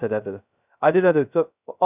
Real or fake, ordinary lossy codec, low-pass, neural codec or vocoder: fake; none; 3.6 kHz; codec, 16 kHz, 0.2 kbps, FocalCodec